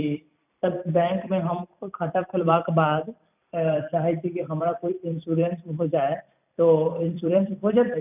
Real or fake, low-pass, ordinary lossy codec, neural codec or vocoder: fake; 3.6 kHz; none; vocoder, 44.1 kHz, 128 mel bands every 256 samples, BigVGAN v2